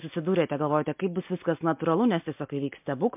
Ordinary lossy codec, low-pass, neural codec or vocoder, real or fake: MP3, 32 kbps; 3.6 kHz; none; real